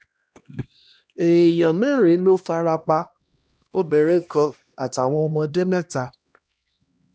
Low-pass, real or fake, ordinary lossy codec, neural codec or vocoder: none; fake; none; codec, 16 kHz, 1 kbps, X-Codec, HuBERT features, trained on LibriSpeech